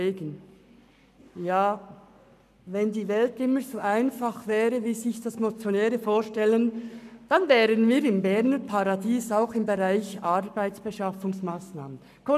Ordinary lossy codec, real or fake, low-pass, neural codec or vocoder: none; fake; 14.4 kHz; codec, 44.1 kHz, 7.8 kbps, Pupu-Codec